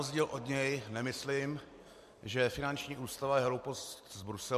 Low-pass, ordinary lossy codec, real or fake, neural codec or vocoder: 14.4 kHz; MP3, 64 kbps; real; none